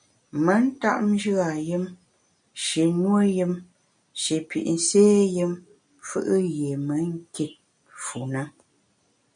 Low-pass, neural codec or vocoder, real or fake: 9.9 kHz; none; real